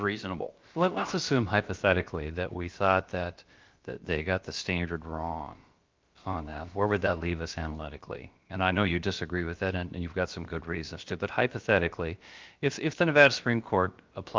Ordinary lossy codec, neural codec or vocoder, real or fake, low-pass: Opus, 24 kbps; codec, 16 kHz, about 1 kbps, DyCAST, with the encoder's durations; fake; 7.2 kHz